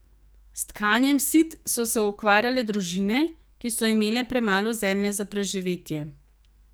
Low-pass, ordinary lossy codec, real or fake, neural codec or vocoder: none; none; fake; codec, 44.1 kHz, 2.6 kbps, SNAC